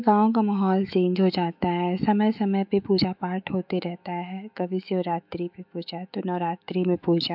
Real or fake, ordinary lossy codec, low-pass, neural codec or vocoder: fake; AAC, 48 kbps; 5.4 kHz; autoencoder, 48 kHz, 128 numbers a frame, DAC-VAE, trained on Japanese speech